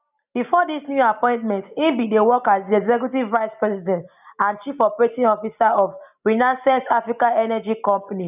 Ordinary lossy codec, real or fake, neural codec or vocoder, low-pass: none; real; none; 3.6 kHz